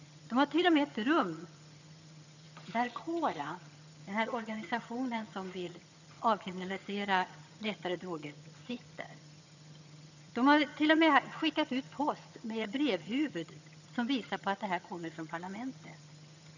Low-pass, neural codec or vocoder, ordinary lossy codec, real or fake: 7.2 kHz; vocoder, 22.05 kHz, 80 mel bands, HiFi-GAN; none; fake